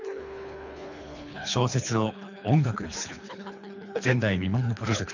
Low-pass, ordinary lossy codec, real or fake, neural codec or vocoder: 7.2 kHz; none; fake; codec, 24 kHz, 3 kbps, HILCodec